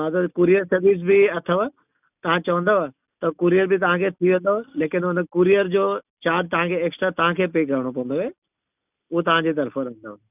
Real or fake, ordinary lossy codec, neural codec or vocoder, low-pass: real; none; none; 3.6 kHz